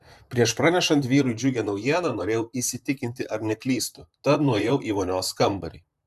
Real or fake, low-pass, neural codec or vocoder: fake; 14.4 kHz; vocoder, 44.1 kHz, 128 mel bands, Pupu-Vocoder